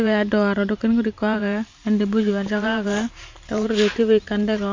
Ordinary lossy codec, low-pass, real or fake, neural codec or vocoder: MP3, 64 kbps; 7.2 kHz; fake; vocoder, 44.1 kHz, 128 mel bands every 512 samples, BigVGAN v2